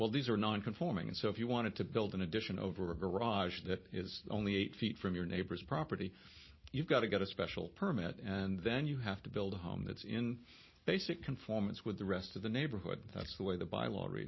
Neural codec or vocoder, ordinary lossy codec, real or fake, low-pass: none; MP3, 24 kbps; real; 7.2 kHz